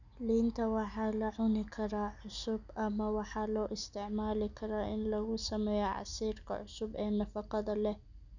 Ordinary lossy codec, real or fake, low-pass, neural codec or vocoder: none; real; 7.2 kHz; none